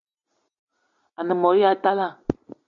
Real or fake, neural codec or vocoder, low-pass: real; none; 7.2 kHz